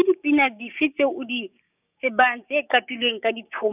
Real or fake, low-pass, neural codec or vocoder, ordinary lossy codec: fake; 3.6 kHz; codec, 16 kHz, 6 kbps, DAC; none